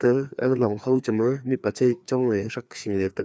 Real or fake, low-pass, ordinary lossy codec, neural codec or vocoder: fake; none; none; codec, 16 kHz, 2 kbps, FreqCodec, larger model